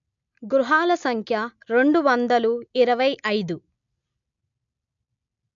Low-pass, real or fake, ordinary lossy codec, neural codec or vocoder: 7.2 kHz; real; MP3, 64 kbps; none